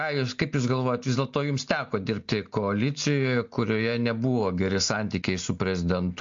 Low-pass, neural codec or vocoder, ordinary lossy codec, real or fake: 7.2 kHz; none; MP3, 48 kbps; real